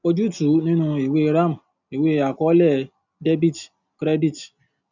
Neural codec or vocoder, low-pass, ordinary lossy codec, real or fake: none; 7.2 kHz; none; real